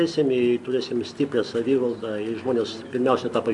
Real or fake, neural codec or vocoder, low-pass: real; none; 10.8 kHz